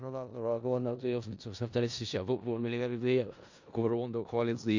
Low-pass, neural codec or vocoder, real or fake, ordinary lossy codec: 7.2 kHz; codec, 16 kHz in and 24 kHz out, 0.4 kbps, LongCat-Audio-Codec, four codebook decoder; fake; AAC, 48 kbps